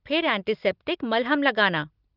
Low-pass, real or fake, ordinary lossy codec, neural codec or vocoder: 5.4 kHz; real; Opus, 24 kbps; none